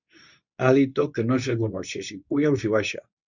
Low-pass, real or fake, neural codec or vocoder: 7.2 kHz; fake; codec, 24 kHz, 0.9 kbps, WavTokenizer, medium speech release version 2